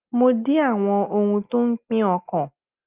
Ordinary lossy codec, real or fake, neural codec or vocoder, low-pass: Opus, 24 kbps; real; none; 3.6 kHz